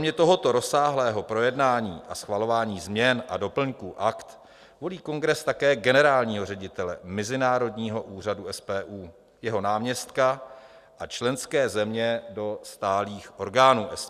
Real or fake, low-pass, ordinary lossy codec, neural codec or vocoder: real; 14.4 kHz; Opus, 64 kbps; none